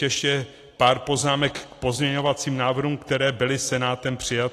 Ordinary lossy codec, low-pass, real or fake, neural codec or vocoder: AAC, 48 kbps; 14.4 kHz; real; none